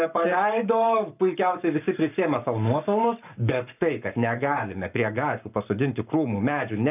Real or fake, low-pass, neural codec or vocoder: fake; 3.6 kHz; codec, 44.1 kHz, 7.8 kbps, Pupu-Codec